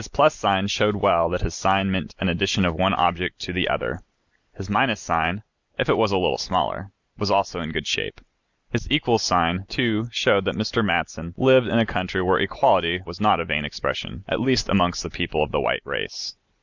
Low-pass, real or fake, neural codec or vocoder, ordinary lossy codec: 7.2 kHz; real; none; Opus, 64 kbps